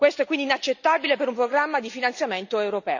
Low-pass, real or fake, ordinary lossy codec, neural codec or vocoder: 7.2 kHz; real; none; none